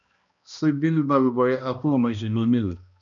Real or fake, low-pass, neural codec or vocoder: fake; 7.2 kHz; codec, 16 kHz, 1 kbps, X-Codec, HuBERT features, trained on balanced general audio